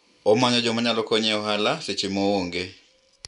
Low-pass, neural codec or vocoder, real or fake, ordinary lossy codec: 10.8 kHz; none; real; none